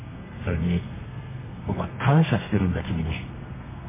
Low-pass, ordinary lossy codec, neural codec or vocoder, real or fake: 3.6 kHz; MP3, 16 kbps; codec, 32 kHz, 1.9 kbps, SNAC; fake